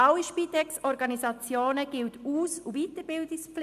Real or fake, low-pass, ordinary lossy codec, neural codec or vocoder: real; 14.4 kHz; AAC, 96 kbps; none